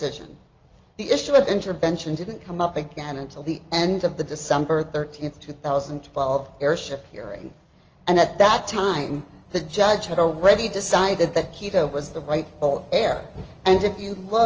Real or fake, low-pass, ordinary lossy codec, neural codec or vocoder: real; 7.2 kHz; Opus, 24 kbps; none